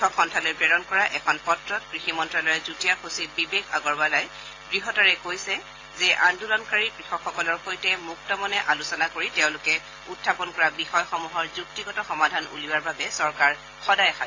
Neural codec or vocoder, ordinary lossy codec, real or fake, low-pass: none; AAC, 32 kbps; real; 7.2 kHz